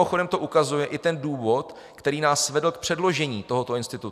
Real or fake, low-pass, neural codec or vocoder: real; 14.4 kHz; none